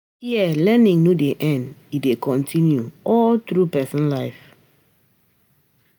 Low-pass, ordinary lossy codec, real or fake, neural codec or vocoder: none; none; real; none